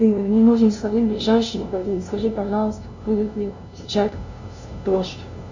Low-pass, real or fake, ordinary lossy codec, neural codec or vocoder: 7.2 kHz; fake; Opus, 64 kbps; codec, 16 kHz, 0.5 kbps, FunCodec, trained on LibriTTS, 25 frames a second